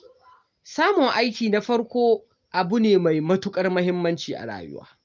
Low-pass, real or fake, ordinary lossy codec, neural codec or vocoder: 7.2 kHz; real; Opus, 24 kbps; none